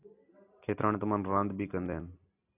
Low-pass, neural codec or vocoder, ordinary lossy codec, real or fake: 3.6 kHz; none; AAC, 32 kbps; real